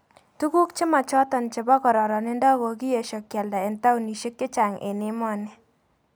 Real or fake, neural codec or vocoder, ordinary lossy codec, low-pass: real; none; none; none